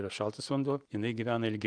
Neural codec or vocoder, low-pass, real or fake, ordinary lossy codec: none; 9.9 kHz; real; Opus, 32 kbps